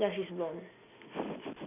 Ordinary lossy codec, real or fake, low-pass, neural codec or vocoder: none; real; 3.6 kHz; none